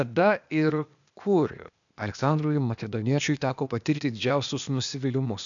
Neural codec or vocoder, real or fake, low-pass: codec, 16 kHz, 0.8 kbps, ZipCodec; fake; 7.2 kHz